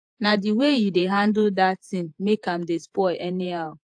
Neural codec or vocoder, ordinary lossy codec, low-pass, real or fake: vocoder, 48 kHz, 128 mel bands, Vocos; AAC, 64 kbps; 9.9 kHz; fake